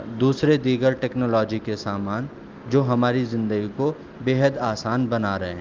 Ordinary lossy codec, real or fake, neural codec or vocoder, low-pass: Opus, 32 kbps; real; none; 7.2 kHz